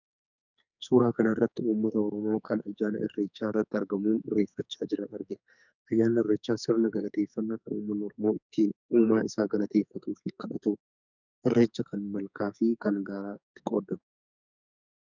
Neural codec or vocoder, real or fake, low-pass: codec, 44.1 kHz, 2.6 kbps, SNAC; fake; 7.2 kHz